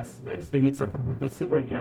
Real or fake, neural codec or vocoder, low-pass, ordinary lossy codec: fake; codec, 44.1 kHz, 0.9 kbps, DAC; 19.8 kHz; MP3, 96 kbps